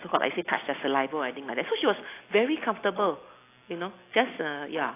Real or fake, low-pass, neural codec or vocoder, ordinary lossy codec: real; 3.6 kHz; none; AAC, 24 kbps